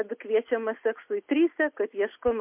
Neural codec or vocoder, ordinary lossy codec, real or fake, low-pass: none; MP3, 32 kbps; real; 3.6 kHz